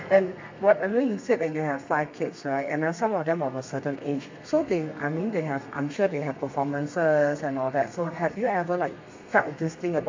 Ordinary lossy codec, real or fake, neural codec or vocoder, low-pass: MP3, 48 kbps; fake; codec, 32 kHz, 1.9 kbps, SNAC; 7.2 kHz